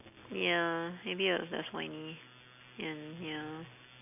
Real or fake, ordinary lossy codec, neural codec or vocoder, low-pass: real; none; none; 3.6 kHz